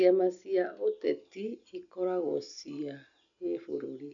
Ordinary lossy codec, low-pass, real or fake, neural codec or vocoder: none; 7.2 kHz; real; none